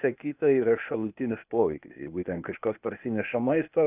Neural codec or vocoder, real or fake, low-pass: codec, 16 kHz, 0.8 kbps, ZipCodec; fake; 3.6 kHz